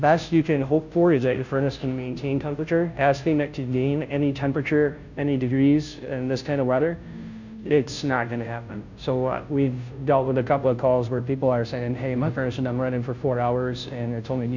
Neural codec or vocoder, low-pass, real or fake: codec, 16 kHz, 0.5 kbps, FunCodec, trained on Chinese and English, 25 frames a second; 7.2 kHz; fake